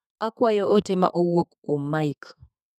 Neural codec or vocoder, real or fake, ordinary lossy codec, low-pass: codec, 32 kHz, 1.9 kbps, SNAC; fake; none; 14.4 kHz